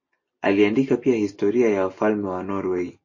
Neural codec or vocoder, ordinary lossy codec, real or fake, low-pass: none; MP3, 32 kbps; real; 7.2 kHz